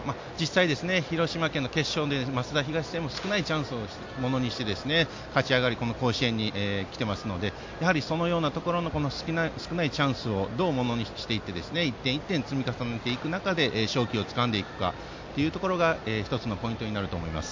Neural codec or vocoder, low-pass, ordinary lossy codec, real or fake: none; 7.2 kHz; MP3, 48 kbps; real